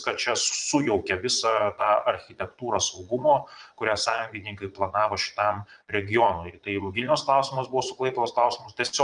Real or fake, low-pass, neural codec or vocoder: fake; 9.9 kHz; vocoder, 22.05 kHz, 80 mel bands, Vocos